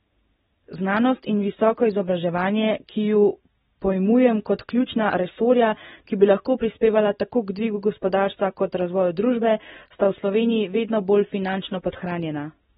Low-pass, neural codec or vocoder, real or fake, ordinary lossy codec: 19.8 kHz; none; real; AAC, 16 kbps